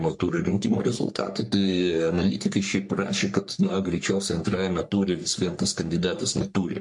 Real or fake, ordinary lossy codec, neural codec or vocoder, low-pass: fake; MP3, 64 kbps; codec, 44.1 kHz, 3.4 kbps, Pupu-Codec; 10.8 kHz